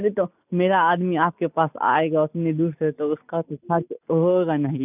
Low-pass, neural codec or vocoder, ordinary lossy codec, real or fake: 3.6 kHz; none; none; real